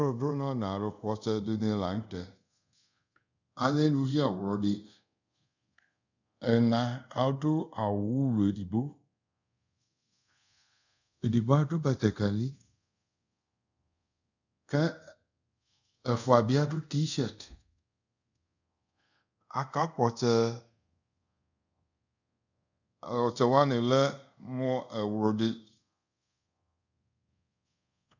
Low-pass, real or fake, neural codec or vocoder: 7.2 kHz; fake; codec, 24 kHz, 0.5 kbps, DualCodec